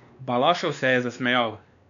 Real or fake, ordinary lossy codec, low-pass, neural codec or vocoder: fake; none; 7.2 kHz; codec, 16 kHz, 2 kbps, X-Codec, WavLM features, trained on Multilingual LibriSpeech